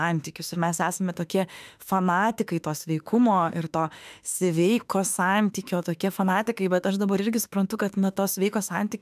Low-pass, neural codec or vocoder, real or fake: 14.4 kHz; autoencoder, 48 kHz, 32 numbers a frame, DAC-VAE, trained on Japanese speech; fake